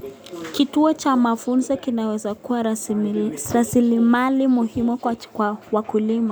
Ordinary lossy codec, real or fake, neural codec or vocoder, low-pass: none; real; none; none